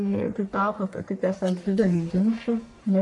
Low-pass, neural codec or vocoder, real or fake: 10.8 kHz; codec, 44.1 kHz, 1.7 kbps, Pupu-Codec; fake